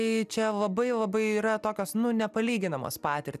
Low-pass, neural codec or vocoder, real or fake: 14.4 kHz; none; real